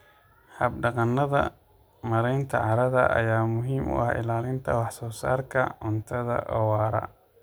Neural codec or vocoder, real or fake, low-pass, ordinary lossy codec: none; real; none; none